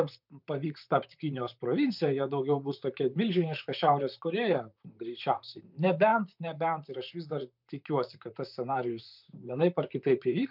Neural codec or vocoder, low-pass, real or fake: vocoder, 44.1 kHz, 128 mel bands, Pupu-Vocoder; 5.4 kHz; fake